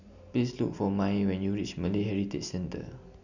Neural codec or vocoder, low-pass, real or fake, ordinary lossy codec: none; 7.2 kHz; real; none